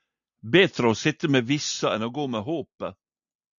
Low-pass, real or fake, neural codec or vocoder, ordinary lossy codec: 7.2 kHz; real; none; MP3, 96 kbps